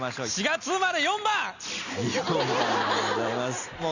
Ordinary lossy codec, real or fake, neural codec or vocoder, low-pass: none; real; none; 7.2 kHz